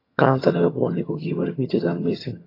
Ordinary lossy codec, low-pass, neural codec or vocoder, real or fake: AAC, 24 kbps; 5.4 kHz; vocoder, 22.05 kHz, 80 mel bands, HiFi-GAN; fake